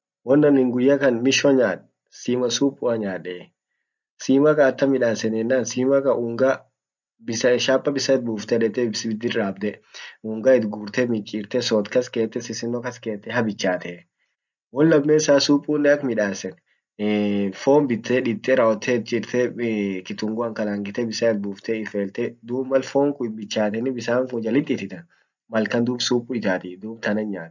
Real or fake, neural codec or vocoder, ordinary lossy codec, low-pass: real; none; none; 7.2 kHz